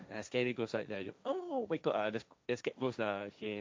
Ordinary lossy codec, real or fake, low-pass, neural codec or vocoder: none; fake; none; codec, 16 kHz, 1.1 kbps, Voila-Tokenizer